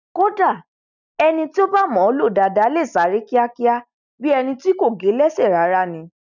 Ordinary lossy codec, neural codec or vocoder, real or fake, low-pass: none; none; real; 7.2 kHz